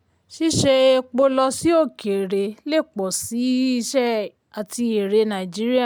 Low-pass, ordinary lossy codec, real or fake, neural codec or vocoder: none; none; real; none